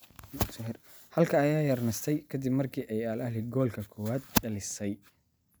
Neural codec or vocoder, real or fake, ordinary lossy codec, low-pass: none; real; none; none